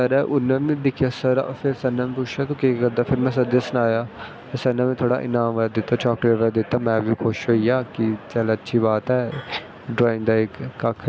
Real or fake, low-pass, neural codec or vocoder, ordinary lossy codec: real; none; none; none